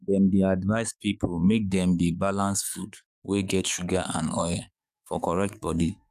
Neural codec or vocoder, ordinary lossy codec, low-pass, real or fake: codec, 44.1 kHz, 7.8 kbps, DAC; none; 14.4 kHz; fake